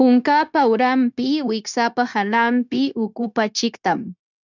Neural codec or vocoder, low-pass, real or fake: codec, 16 kHz, 0.9 kbps, LongCat-Audio-Codec; 7.2 kHz; fake